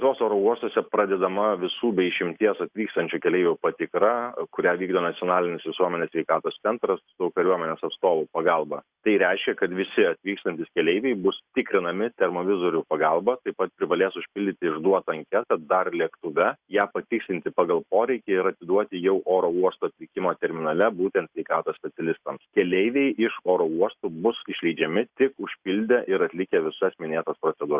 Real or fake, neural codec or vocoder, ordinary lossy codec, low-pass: real; none; Opus, 64 kbps; 3.6 kHz